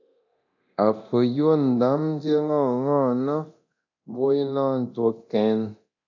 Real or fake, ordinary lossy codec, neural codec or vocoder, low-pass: fake; AAC, 48 kbps; codec, 24 kHz, 0.9 kbps, DualCodec; 7.2 kHz